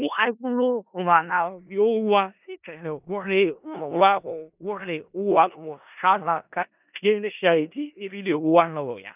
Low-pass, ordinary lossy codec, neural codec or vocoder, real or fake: 3.6 kHz; none; codec, 16 kHz in and 24 kHz out, 0.4 kbps, LongCat-Audio-Codec, four codebook decoder; fake